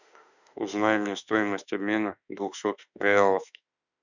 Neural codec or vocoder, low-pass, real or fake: autoencoder, 48 kHz, 32 numbers a frame, DAC-VAE, trained on Japanese speech; 7.2 kHz; fake